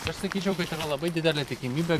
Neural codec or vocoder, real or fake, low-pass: vocoder, 44.1 kHz, 128 mel bands every 256 samples, BigVGAN v2; fake; 14.4 kHz